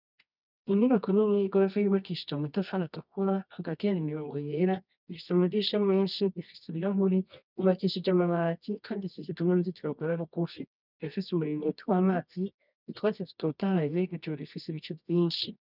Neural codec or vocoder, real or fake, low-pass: codec, 24 kHz, 0.9 kbps, WavTokenizer, medium music audio release; fake; 5.4 kHz